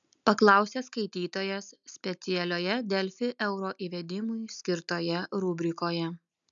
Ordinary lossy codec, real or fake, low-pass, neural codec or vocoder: MP3, 96 kbps; real; 7.2 kHz; none